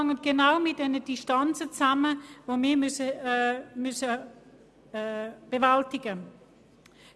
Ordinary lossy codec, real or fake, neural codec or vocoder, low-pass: none; real; none; none